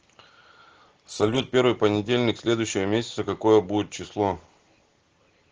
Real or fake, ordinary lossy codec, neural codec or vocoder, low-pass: real; Opus, 16 kbps; none; 7.2 kHz